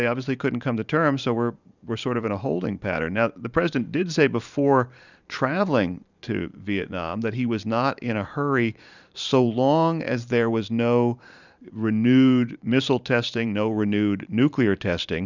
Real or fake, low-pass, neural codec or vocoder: fake; 7.2 kHz; vocoder, 44.1 kHz, 128 mel bands every 512 samples, BigVGAN v2